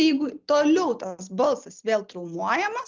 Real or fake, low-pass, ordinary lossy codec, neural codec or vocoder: real; 7.2 kHz; Opus, 24 kbps; none